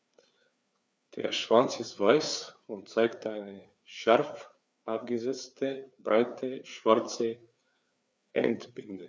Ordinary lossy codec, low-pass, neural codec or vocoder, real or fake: none; none; codec, 16 kHz, 4 kbps, FreqCodec, larger model; fake